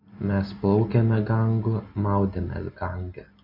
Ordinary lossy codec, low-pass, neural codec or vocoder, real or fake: MP3, 32 kbps; 5.4 kHz; none; real